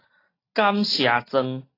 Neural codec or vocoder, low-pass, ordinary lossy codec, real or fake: none; 5.4 kHz; AAC, 24 kbps; real